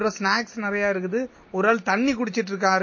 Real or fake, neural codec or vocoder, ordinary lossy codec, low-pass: real; none; MP3, 32 kbps; 7.2 kHz